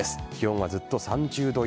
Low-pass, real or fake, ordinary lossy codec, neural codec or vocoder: none; real; none; none